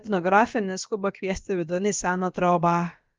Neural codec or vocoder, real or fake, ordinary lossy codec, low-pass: codec, 16 kHz, about 1 kbps, DyCAST, with the encoder's durations; fake; Opus, 32 kbps; 7.2 kHz